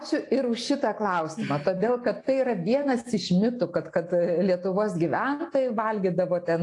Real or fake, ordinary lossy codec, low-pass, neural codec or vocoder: real; AAC, 48 kbps; 10.8 kHz; none